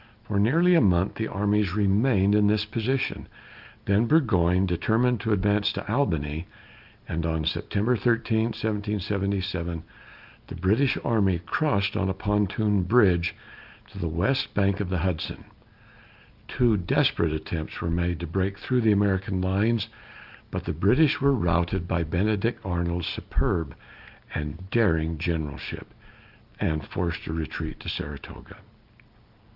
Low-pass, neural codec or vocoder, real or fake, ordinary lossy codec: 5.4 kHz; none; real; Opus, 24 kbps